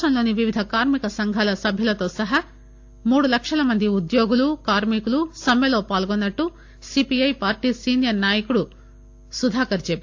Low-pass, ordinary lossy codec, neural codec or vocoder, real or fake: 7.2 kHz; AAC, 48 kbps; none; real